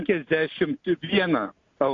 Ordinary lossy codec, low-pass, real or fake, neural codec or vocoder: AAC, 48 kbps; 7.2 kHz; real; none